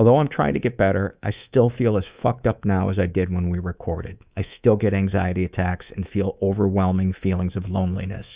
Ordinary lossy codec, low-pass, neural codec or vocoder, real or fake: Opus, 64 kbps; 3.6 kHz; codec, 24 kHz, 3.1 kbps, DualCodec; fake